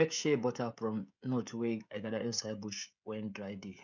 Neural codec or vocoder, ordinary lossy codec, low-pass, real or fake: codec, 16 kHz, 16 kbps, FreqCodec, smaller model; none; 7.2 kHz; fake